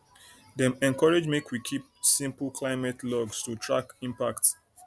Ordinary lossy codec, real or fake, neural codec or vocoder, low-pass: none; real; none; none